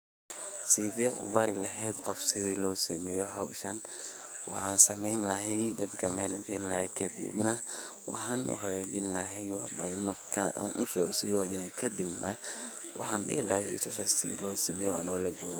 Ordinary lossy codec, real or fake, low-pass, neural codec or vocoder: none; fake; none; codec, 44.1 kHz, 2.6 kbps, SNAC